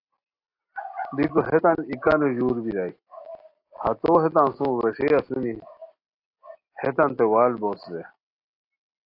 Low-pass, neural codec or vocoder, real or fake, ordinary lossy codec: 5.4 kHz; none; real; AAC, 32 kbps